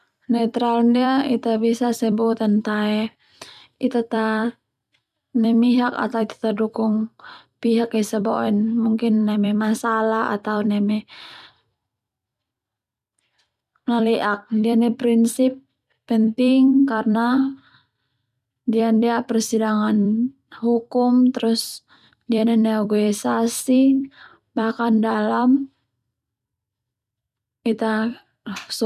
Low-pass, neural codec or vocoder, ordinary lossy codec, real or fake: 14.4 kHz; vocoder, 44.1 kHz, 128 mel bands every 256 samples, BigVGAN v2; none; fake